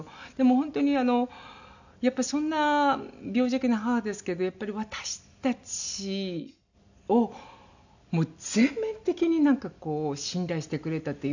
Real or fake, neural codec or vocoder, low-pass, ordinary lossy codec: real; none; 7.2 kHz; none